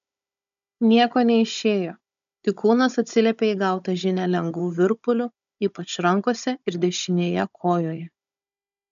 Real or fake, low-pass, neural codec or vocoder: fake; 7.2 kHz; codec, 16 kHz, 16 kbps, FunCodec, trained on Chinese and English, 50 frames a second